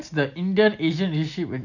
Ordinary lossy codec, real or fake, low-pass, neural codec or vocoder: none; real; 7.2 kHz; none